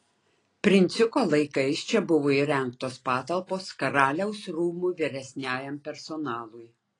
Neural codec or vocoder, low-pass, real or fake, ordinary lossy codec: none; 9.9 kHz; real; AAC, 32 kbps